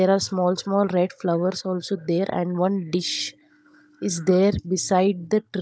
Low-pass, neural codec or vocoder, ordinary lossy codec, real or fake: none; codec, 16 kHz, 16 kbps, FunCodec, trained on Chinese and English, 50 frames a second; none; fake